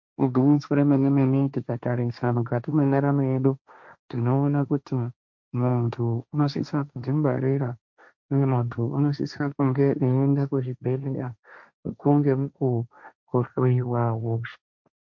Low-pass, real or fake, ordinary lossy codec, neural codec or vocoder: 7.2 kHz; fake; MP3, 48 kbps; codec, 16 kHz, 1.1 kbps, Voila-Tokenizer